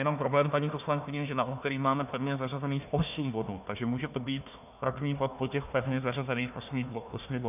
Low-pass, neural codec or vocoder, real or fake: 3.6 kHz; codec, 16 kHz, 1 kbps, FunCodec, trained on Chinese and English, 50 frames a second; fake